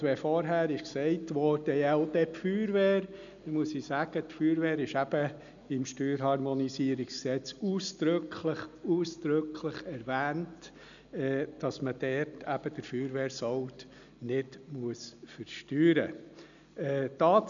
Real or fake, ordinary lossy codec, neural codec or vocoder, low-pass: real; none; none; 7.2 kHz